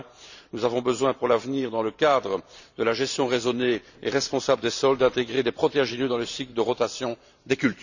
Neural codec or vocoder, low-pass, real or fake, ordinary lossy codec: vocoder, 44.1 kHz, 128 mel bands every 512 samples, BigVGAN v2; 7.2 kHz; fake; none